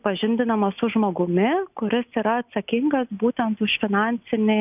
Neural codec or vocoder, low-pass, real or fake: none; 3.6 kHz; real